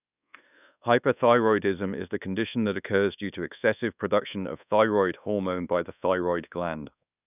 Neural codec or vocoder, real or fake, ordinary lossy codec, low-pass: codec, 24 kHz, 1.2 kbps, DualCodec; fake; none; 3.6 kHz